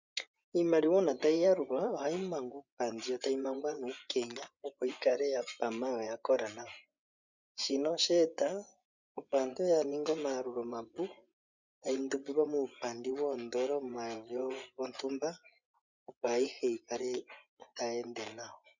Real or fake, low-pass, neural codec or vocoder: fake; 7.2 kHz; vocoder, 24 kHz, 100 mel bands, Vocos